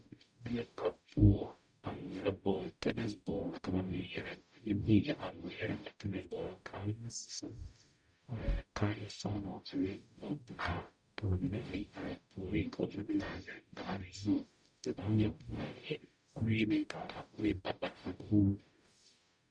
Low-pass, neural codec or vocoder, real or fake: 9.9 kHz; codec, 44.1 kHz, 0.9 kbps, DAC; fake